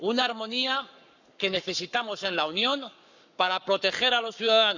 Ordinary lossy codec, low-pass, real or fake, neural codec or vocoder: none; 7.2 kHz; fake; codec, 44.1 kHz, 7.8 kbps, Pupu-Codec